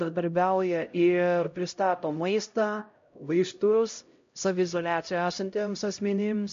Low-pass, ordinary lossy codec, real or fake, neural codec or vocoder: 7.2 kHz; MP3, 48 kbps; fake; codec, 16 kHz, 0.5 kbps, X-Codec, HuBERT features, trained on LibriSpeech